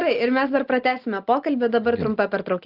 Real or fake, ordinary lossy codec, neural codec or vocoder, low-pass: real; Opus, 32 kbps; none; 5.4 kHz